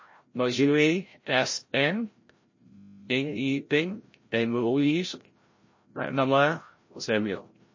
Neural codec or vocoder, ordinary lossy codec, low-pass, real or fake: codec, 16 kHz, 0.5 kbps, FreqCodec, larger model; MP3, 32 kbps; 7.2 kHz; fake